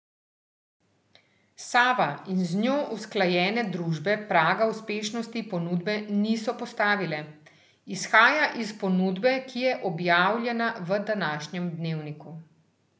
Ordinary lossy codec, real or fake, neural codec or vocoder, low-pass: none; real; none; none